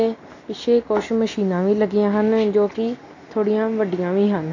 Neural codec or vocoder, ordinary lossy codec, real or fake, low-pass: none; AAC, 48 kbps; real; 7.2 kHz